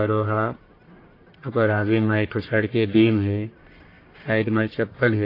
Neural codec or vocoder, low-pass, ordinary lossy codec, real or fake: codec, 44.1 kHz, 1.7 kbps, Pupu-Codec; 5.4 kHz; AAC, 32 kbps; fake